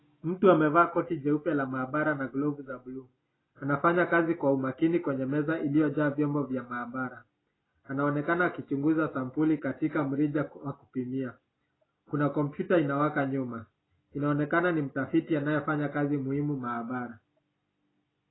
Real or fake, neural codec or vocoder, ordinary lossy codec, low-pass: real; none; AAC, 16 kbps; 7.2 kHz